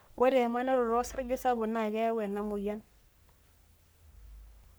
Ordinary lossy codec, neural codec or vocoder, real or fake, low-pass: none; codec, 44.1 kHz, 3.4 kbps, Pupu-Codec; fake; none